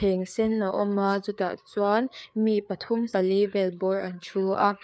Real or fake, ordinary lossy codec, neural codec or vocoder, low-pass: fake; none; codec, 16 kHz, 4 kbps, FreqCodec, larger model; none